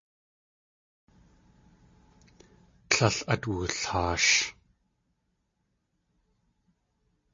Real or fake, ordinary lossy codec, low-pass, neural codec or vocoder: real; MP3, 32 kbps; 7.2 kHz; none